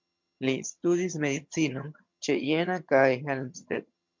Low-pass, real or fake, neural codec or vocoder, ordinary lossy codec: 7.2 kHz; fake; vocoder, 22.05 kHz, 80 mel bands, HiFi-GAN; MP3, 64 kbps